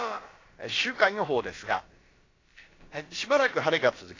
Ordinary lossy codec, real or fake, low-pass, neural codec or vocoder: AAC, 32 kbps; fake; 7.2 kHz; codec, 16 kHz, about 1 kbps, DyCAST, with the encoder's durations